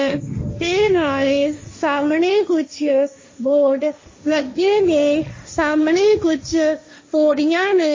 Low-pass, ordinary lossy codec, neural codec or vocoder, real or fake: 7.2 kHz; MP3, 32 kbps; codec, 16 kHz, 1.1 kbps, Voila-Tokenizer; fake